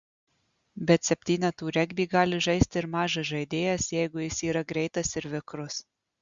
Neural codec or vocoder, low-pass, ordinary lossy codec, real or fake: none; 7.2 kHz; Opus, 64 kbps; real